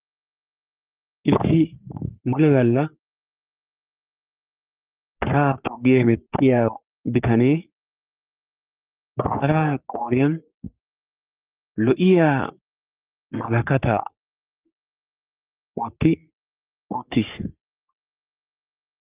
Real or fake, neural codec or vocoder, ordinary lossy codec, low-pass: fake; codec, 44.1 kHz, 3.4 kbps, Pupu-Codec; Opus, 32 kbps; 3.6 kHz